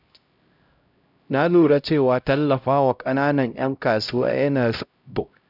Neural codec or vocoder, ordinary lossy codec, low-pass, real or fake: codec, 16 kHz, 0.5 kbps, X-Codec, HuBERT features, trained on LibriSpeech; none; 5.4 kHz; fake